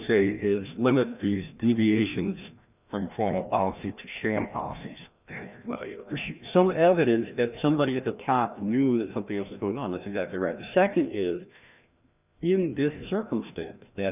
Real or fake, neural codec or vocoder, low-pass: fake; codec, 16 kHz, 1 kbps, FreqCodec, larger model; 3.6 kHz